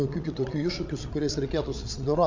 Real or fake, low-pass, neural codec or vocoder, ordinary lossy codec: fake; 7.2 kHz; codec, 16 kHz, 16 kbps, FreqCodec, larger model; MP3, 48 kbps